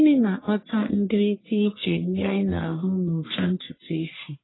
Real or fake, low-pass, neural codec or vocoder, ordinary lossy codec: fake; 7.2 kHz; codec, 44.1 kHz, 1.7 kbps, Pupu-Codec; AAC, 16 kbps